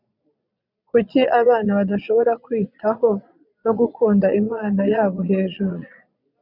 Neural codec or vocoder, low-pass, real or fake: vocoder, 22.05 kHz, 80 mel bands, Vocos; 5.4 kHz; fake